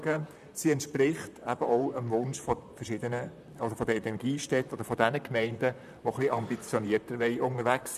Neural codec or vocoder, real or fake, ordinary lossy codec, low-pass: vocoder, 44.1 kHz, 128 mel bands, Pupu-Vocoder; fake; none; 14.4 kHz